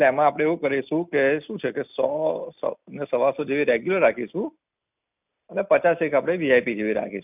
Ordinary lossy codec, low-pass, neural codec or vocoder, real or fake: none; 3.6 kHz; none; real